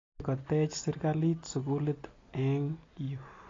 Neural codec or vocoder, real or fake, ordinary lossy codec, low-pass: none; real; AAC, 32 kbps; 7.2 kHz